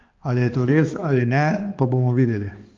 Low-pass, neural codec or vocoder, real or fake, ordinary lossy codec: 7.2 kHz; codec, 16 kHz, 2 kbps, X-Codec, HuBERT features, trained on balanced general audio; fake; Opus, 32 kbps